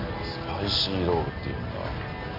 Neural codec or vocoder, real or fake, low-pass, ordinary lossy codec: none; real; 5.4 kHz; AAC, 24 kbps